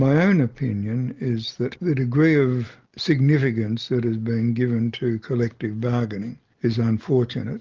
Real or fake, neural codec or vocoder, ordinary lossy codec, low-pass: real; none; Opus, 32 kbps; 7.2 kHz